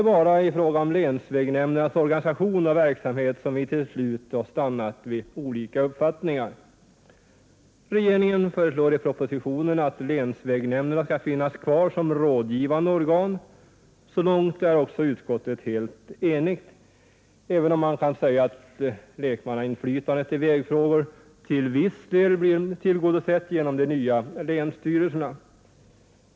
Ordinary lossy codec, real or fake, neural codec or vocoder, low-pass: none; real; none; none